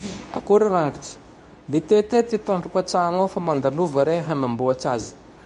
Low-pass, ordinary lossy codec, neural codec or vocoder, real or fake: 10.8 kHz; MP3, 64 kbps; codec, 24 kHz, 0.9 kbps, WavTokenizer, medium speech release version 2; fake